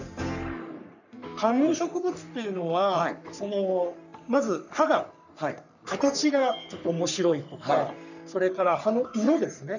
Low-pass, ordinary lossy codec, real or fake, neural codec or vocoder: 7.2 kHz; none; fake; codec, 44.1 kHz, 3.4 kbps, Pupu-Codec